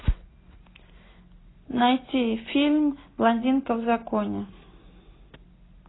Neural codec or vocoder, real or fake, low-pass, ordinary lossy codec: none; real; 7.2 kHz; AAC, 16 kbps